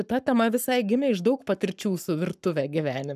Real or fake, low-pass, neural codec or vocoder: fake; 14.4 kHz; codec, 44.1 kHz, 7.8 kbps, Pupu-Codec